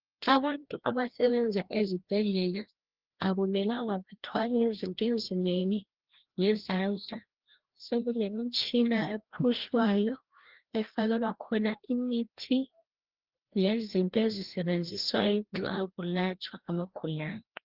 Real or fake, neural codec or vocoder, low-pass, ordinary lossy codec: fake; codec, 16 kHz, 1 kbps, FreqCodec, larger model; 5.4 kHz; Opus, 16 kbps